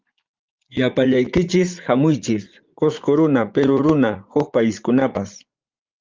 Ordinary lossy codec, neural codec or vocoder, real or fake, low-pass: Opus, 24 kbps; vocoder, 44.1 kHz, 80 mel bands, Vocos; fake; 7.2 kHz